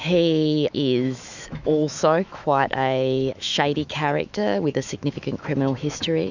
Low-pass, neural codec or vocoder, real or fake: 7.2 kHz; autoencoder, 48 kHz, 128 numbers a frame, DAC-VAE, trained on Japanese speech; fake